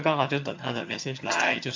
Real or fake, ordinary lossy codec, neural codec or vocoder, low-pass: fake; MP3, 48 kbps; vocoder, 22.05 kHz, 80 mel bands, HiFi-GAN; 7.2 kHz